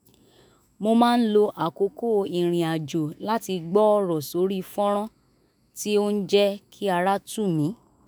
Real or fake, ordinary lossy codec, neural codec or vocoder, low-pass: fake; none; autoencoder, 48 kHz, 128 numbers a frame, DAC-VAE, trained on Japanese speech; none